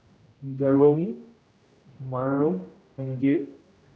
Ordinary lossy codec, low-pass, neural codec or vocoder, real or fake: none; none; codec, 16 kHz, 0.5 kbps, X-Codec, HuBERT features, trained on general audio; fake